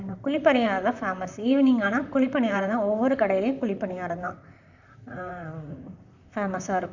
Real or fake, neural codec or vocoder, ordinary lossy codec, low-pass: fake; vocoder, 44.1 kHz, 128 mel bands, Pupu-Vocoder; none; 7.2 kHz